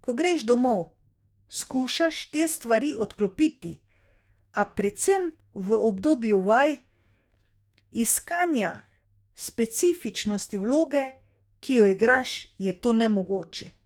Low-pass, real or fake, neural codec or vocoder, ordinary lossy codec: 19.8 kHz; fake; codec, 44.1 kHz, 2.6 kbps, DAC; none